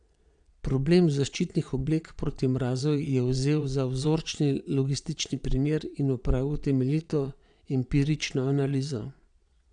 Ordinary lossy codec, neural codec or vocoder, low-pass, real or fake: none; vocoder, 22.05 kHz, 80 mel bands, Vocos; 9.9 kHz; fake